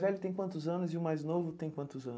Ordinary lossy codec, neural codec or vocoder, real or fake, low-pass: none; none; real; none